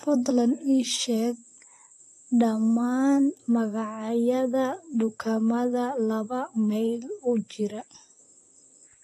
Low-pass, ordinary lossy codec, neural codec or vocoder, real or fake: 14.4 kHz; AAC, 48 kbps; vocoder, 44.1 kHz, 128 mel bands, Pupu-Vocoder; fake